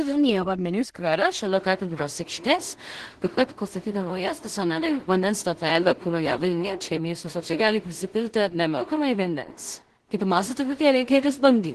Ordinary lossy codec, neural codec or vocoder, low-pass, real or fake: Opus, 16 kbps; codec, 16 kHz in and 24 kHz out, 0.4 kbps, LongCat-Audio-Codec, two codebook decoder; 10.8 kHz; fake